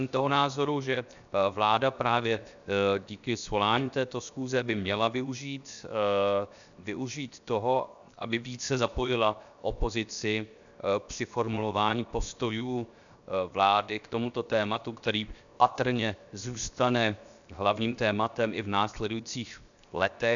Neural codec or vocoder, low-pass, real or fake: codec, 16 kHz, 0.7 kbps, FocalCodec; 7.2 kHz; fake